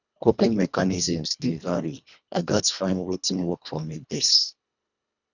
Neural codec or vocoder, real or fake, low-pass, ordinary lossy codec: codec, 24 kHz, 1.5 kbps, HILCodec; fake; 7.2 kHz; none